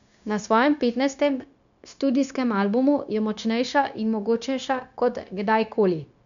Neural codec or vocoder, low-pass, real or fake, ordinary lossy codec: codec, 16 kHz, 0.9 kbps, LongCat-Audio-Codec; 7.2 kHz; fake; none